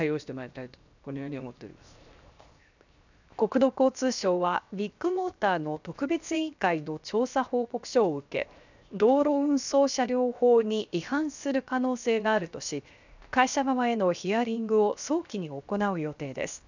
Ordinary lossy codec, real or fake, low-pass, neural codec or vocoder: none; fake; 7.2 kHz; codec, 16 kHz, 0.7 kbps, FocalCodec